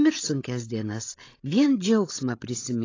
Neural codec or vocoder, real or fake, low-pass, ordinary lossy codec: codec, 16 kHz, 16 kbps, FreqCodec, larger model; fake; 7.2 kHz; AAC, 32 kbps